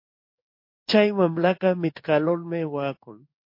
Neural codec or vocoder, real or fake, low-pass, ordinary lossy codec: codec, 16 kHz in and 24 kHz out, 1 kbps, XY-Tokenizer; fake; 5.4 kHz; MP3, 32 kbps